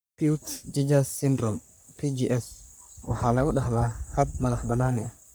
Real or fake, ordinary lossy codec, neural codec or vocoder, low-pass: fake; none; codec, 44.1 kHz, 3.4 kbps, Pupu-Codec; none